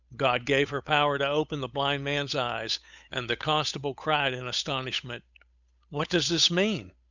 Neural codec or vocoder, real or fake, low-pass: codec, 16 kHz, 8 kbps, FunCodec, trained on Chinese and English, 25 frames a second; fake; 7.2 kHz